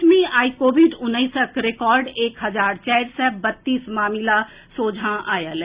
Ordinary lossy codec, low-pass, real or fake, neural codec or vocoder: Opus, 64 kbps; 3.6 kHz; real; none